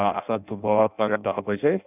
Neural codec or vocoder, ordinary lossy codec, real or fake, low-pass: codec, 16 kHz in and 24 kHz out, 0.6 kbps, FireRedTTS-2 codec; none; fake; 3.6 kHz